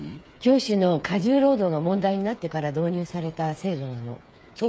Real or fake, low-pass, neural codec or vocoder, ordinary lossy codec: fake; none; codec, 16 kHz, 8 kbps, FreqCodec, smaller model; none